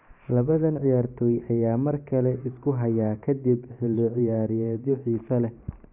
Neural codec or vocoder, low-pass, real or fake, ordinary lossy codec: vocoder, 44.1 kHz, 128 mel bands every 512 samples, BigVGAN v2; 3.6 kHz; fake; none